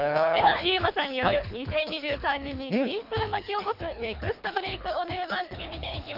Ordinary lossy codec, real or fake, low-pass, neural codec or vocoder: none; fake; 5.4 kHz; codec, 24 kHz, 3 kbps, HILCodec